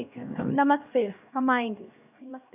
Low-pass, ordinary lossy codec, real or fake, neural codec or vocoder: 3.6 kHz; none; fake; codec, 16 kHz, 1 kbps, X-Codec, HuBERT features, trained on LibriSpeech